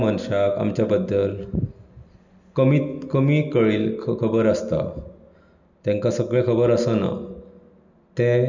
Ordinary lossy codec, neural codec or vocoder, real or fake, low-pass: none; none; real; 7.2 kHz